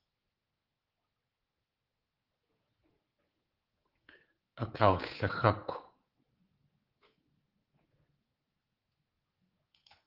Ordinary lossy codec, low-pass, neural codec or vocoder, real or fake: Opus, 16 kbps; 5.4 kHz; codec, 24 kHz, 3.1 kbps, DualCodec; fake